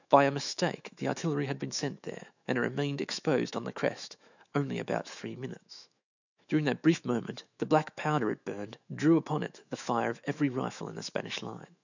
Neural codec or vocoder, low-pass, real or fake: autoencoder, 48 kHz, 128 numbers a frame, DAC-VAE, trained on Japanese speech; 7.2 kHz; fake